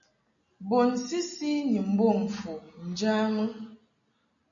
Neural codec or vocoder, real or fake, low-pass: none; real; 7.2 kHz